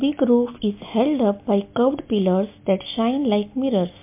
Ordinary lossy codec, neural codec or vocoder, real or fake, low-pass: MP3, 24 kbps; none; real; 3.6 kHz